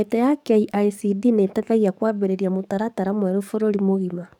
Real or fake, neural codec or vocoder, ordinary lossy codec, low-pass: fake; codec, 44.1 kHz, 7.8 kbps, DAC; none; 19.8 kHz